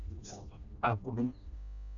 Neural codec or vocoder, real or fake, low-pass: codec, 16 kHz, 1 kbps, FreqCodec, smaller model; fake; 7.2 kHz